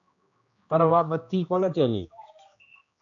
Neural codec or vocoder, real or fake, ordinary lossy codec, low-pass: codec, 16 kHz, 1 kbps, X-Codec, HuBERT features, trained on general audio; fake; AAC, 64 kbps; 7.2 kHz